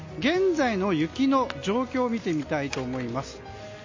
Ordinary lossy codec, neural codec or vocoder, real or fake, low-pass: MP3, 32 kbps; none; real; 7.2 kHz